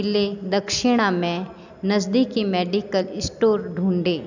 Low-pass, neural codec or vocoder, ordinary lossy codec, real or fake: 7.2 kHz; none; none; real